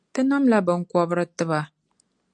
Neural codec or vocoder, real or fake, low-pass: none; real; 9.9 kHz